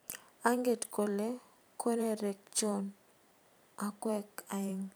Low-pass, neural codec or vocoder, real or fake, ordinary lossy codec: none; vocoder, 44.1 kHz, 128 mel bands every 512 samples, BigVGAN v2; fake; none